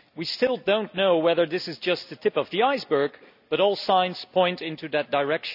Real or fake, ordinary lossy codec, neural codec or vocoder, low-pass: real; none; none; 5.4 kHz